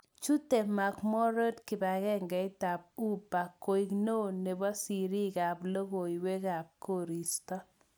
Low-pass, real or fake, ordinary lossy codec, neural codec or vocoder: none; real; none; none